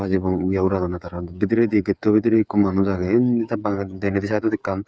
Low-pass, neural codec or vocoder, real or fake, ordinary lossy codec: none; codec, 16 kHz, 8 kbps, FreqCodec, smaller model; fake; none